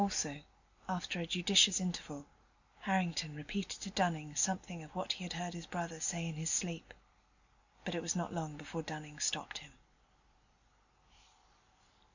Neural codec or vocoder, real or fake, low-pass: none; real; 7.2 kHz